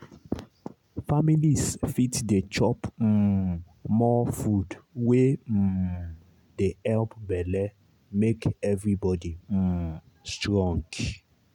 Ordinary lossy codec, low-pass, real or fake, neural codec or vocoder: none; 19.8 kHz; real; none